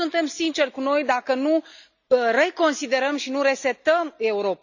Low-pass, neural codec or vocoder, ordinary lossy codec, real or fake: 7.2 kHz; none; none; real